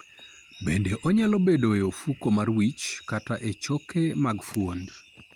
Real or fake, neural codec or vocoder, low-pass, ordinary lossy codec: real; none; 19.8 kHz; Opus, 32 kbps